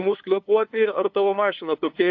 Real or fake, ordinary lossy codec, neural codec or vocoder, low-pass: fake; AAC, 48 kbps; codec, 16 kHz, 2 kbps, FunCodec, trained on LibriTTS, 25 frames a second; 7.2 kHz